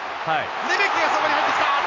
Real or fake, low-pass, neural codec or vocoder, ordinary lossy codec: real; 7.2 kHz; none; AAC, 32 kbps